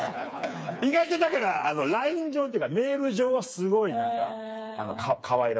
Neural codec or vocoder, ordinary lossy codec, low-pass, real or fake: codec, 16 kHz, 4 kbps, FreqCodec, smaller model; none; none; fake